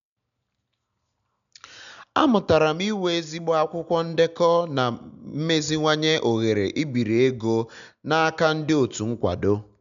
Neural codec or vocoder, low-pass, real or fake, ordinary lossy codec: none; 7.2 kHz; real; none